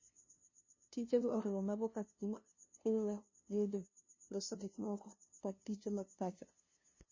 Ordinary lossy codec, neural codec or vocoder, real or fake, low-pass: MP3, 32 kbps; codec, 16 kHz, 0.5 kbps, FunCodec, trained on LibriTTS, 25 frames a second; fake; 7.2 kHz